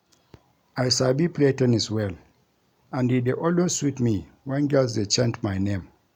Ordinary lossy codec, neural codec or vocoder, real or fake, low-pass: none; none; real; 19.8 kHz